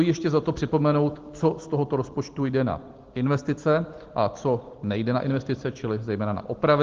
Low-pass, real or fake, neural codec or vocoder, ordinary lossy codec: 7.2 kHz; real; none; Opus, 16 kbps